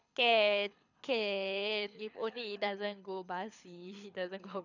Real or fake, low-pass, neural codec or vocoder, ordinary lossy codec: fake; 7.2 kHz; codec, 24 kHz, 6 kbps, HILCodec; none